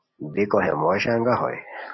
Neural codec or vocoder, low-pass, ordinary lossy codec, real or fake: none; 7.2 kHz; MP3, 24 kbps; real